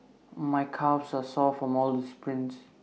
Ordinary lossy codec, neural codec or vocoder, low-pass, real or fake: none; none; none; real